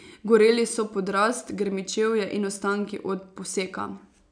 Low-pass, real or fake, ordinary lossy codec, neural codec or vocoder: 9.9 kHz; real; none; none